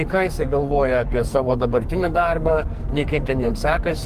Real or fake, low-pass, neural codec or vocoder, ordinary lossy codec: fake; 14.4 kHz; codec, 44.1 kHz, 2.6 kbps, SNAC; Opus, 32 kbps